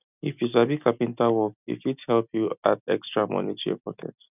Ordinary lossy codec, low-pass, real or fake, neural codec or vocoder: none; 3.6 kHz; real; none